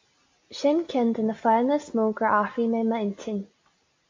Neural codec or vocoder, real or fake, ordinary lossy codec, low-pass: none; real; MP3, 48 kbps; 7.2 kHz